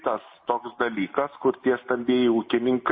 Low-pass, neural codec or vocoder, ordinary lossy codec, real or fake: 7.2 kHz; none; MP3, 24 kbps; real